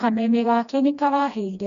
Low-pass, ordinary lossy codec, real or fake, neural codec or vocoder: 7.2 kHz; none; fake; codec, 16 kHz, 1 kbps, FreqCodec, smaller model